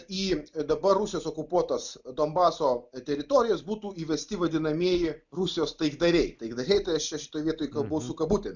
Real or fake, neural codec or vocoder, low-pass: real; none; 7.2 kHz